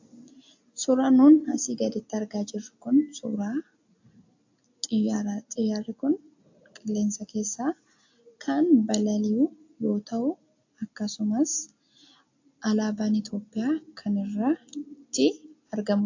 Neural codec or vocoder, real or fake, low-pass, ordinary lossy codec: none; real; 7.2 kHz; AAC, 48 kbps